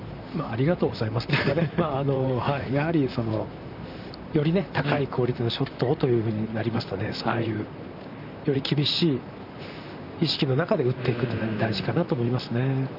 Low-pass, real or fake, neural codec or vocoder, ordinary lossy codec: 5.4 kHz; fake; vocoder, 44.1 kHz, 128 mel bands, Pupu-Vocoder; none